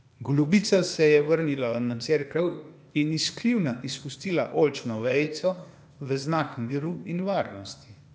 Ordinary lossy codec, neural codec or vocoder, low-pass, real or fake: none; codec, 16 kHz, 0.8 kbps, ZipCodec; none; fake